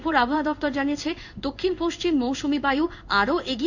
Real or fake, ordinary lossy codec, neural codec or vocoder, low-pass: fake; none; codec, 16 kHz in and 24 kHz out, 1 kbps, XY-Tokenizer; 7.2 kHz